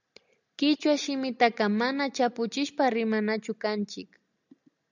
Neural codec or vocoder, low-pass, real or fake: none; 7.2 kHz; real